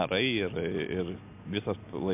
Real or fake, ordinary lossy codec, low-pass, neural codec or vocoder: real; MP3, 32 kbps; 3.6 kHz; none